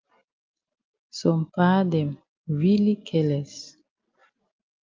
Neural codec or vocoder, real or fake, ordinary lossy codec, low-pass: none; real; Opus, 24 kbps; 7.2 kHz